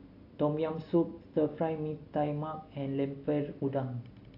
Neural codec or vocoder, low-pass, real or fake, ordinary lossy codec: none; 5.4 kHz; real; AAC, 32 kbps